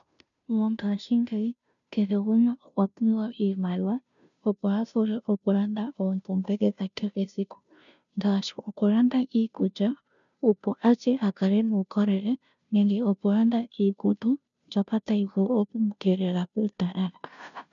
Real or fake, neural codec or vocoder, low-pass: fake; codec, 16 kHz, 0.5 kbps, FunCodec, trained on Chinese and English, 25 frames a second; 7.2 kHz